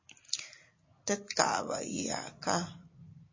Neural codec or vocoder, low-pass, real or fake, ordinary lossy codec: none; 7.2 kHz; real; MP3, 32 kbps